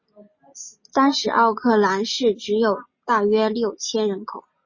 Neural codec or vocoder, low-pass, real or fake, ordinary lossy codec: none; 7.2 kHz; real; MP3, 32 kbps